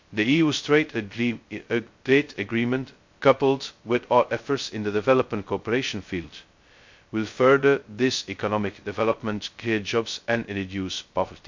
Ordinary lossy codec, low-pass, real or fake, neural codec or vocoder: MP3, 48 kbps; 7.2 kHz; fake; codec, 16 kHz, 0.2 kbps, FocalCodec